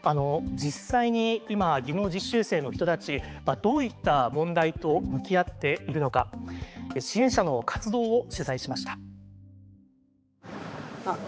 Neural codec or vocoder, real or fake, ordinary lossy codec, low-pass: codec, 16 kHz, 4 kbps, X-Codec, HuBERT features, trained on balanced general audio; fake; none; none